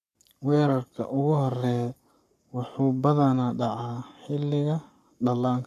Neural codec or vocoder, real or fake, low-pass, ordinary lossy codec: codec, 44.1 kHz, 7.8 kbps, Pupu-Codec; fake; 14.4 kHz; none